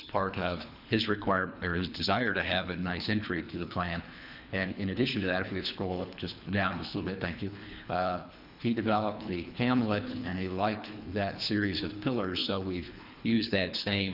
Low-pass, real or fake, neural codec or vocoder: 5.4 kHz; fake; codec, 24 kHz, 3 kbps, HILCodec